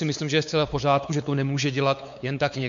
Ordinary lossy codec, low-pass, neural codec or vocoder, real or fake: MP3, 64 kbps; 7.2 kHz; codec, 16 kHz, 4 kbps, X-Codec, WavLM features, trained on Multilingual LibriSpeech; fake